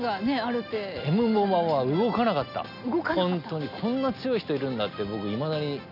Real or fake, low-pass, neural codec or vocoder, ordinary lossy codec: real; 5.4 kHz; none; none